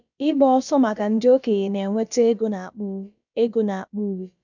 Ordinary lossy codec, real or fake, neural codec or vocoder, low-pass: none; fake; codec, 16 kHz, about 1 kbps, DyCAST, with the encoder's durations; 7.2 kHz